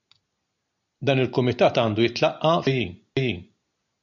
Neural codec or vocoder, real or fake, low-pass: none; real; 7.2 kHz